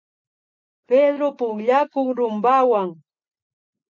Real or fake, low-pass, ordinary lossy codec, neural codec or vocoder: real; 7.2 kHz; MP3, 48 kbps; none